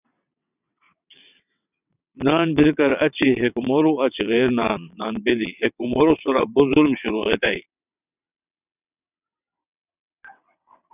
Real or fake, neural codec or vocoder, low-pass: fake; vocoder, 22.05 kHz, 80 mel bands, WaveNeXt; 3.6 kHz